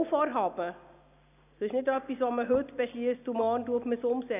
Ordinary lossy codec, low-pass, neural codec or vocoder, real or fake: none; 3.6 kHz; none; real